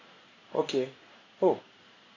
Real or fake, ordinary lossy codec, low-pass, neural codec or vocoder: fake; AAC, 32 kbps; 7.2 kHz; codec, 16 kHz in and 24 kHz out, 1 kbps, XY-Tokenizer